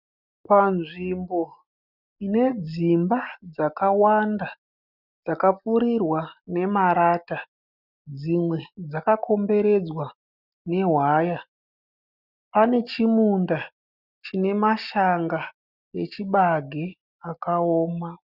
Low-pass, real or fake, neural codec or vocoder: 5.4 kHz; real; none